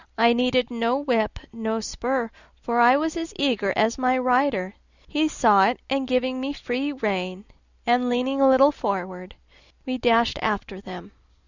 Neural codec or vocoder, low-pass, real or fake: none; 7.2 kHz; real